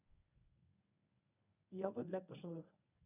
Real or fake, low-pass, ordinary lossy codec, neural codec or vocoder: fake; 3.6 kHz; none; codec, 24 kHz, 0.9 kbps, WavTokenizer, medium speech release version 1